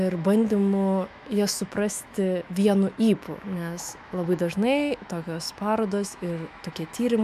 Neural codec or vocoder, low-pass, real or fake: autoencoder, 48 kHz, 128 numbers a frame, DAC-VAE, trained on Japanese speech; 14.4 kHz; fake